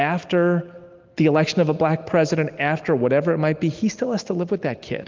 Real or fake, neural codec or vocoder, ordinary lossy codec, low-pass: real; none; Opus, 32 kbps; 7.2 kHz